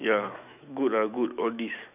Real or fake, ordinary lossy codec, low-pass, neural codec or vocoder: fake; none; 3.6 kHz; autoencoder, 48 kHz, 128 numbers a frame, DAC-VAE, trained on Japanese speech